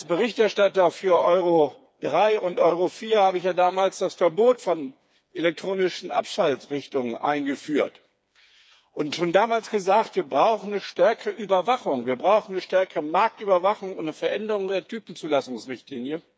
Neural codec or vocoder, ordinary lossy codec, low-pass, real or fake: codec, 16 kHz, 4 kbps, FreqCodec, smaller model; none; none; fake